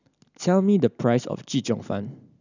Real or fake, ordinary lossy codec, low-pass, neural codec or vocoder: real; none; 7.2 kHz; none